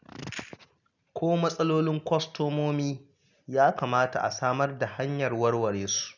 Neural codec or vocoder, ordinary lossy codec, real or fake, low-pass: none; none; real; 7.2 kHz